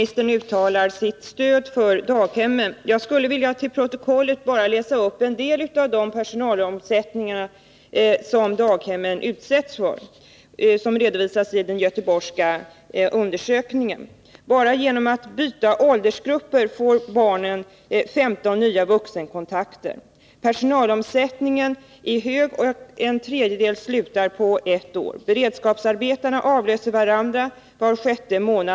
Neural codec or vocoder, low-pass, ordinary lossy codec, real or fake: none; none; none; real